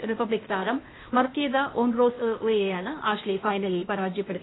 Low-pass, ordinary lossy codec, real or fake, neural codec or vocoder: 7.2 kHz; AAC, 16 kbps; fake; codec, 16 kHz, 0.8 kbps, ZipCodec